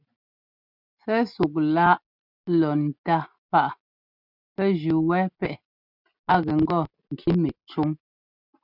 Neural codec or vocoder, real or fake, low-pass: none; real; 5.4 kHz